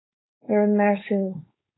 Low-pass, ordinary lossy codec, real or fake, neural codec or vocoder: 7.2 kHz; AAC, 16 kbps; fake; codec, 16 kHz, 4.8 kbps, FACodec